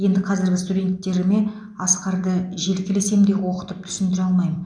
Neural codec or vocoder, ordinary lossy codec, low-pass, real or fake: none; none; 9.9 kHz; real